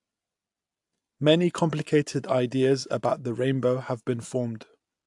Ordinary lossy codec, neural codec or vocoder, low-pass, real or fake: AAC, 64 kbps; none; 10.8 kHz; real